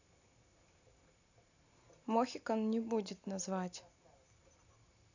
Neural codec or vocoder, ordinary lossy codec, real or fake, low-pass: none; none; real; 7.2 kHz